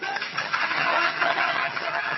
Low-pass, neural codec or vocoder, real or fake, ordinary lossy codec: 7.2 kHz; vocoder, 22.05 kHz, 80 mel bands, HiFi-GAN; fake; MP3, 24 kbps